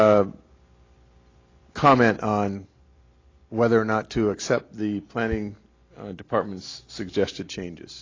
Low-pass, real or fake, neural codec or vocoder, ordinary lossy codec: 7.2 kHz; real; none; AAC, 32 kbps